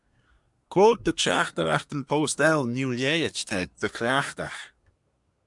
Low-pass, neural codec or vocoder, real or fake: 10.8 kHz; codec, 24 kHz, 1 kbps, SNAC; fake